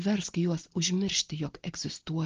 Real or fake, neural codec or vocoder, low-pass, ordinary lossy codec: real; none; 7.2 kHz; Opus, 16 kbps